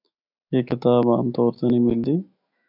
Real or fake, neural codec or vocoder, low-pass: fake; autoencoder, 48 kHz, 128 numbers a frame, DAC-VAE, trained on Japanese speech; 5.4 kHz